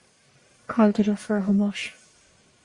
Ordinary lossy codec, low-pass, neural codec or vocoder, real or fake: Opus, 64 kbps; 10.8 kHz; codec, 44.1 kHz, 1.7 kbps, Pupu-Codec; fake